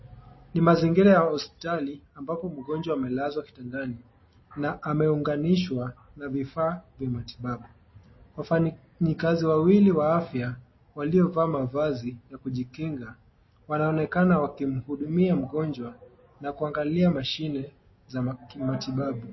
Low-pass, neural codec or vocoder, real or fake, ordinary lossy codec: 7.2 kHz; none; real; MP3, 24 kbps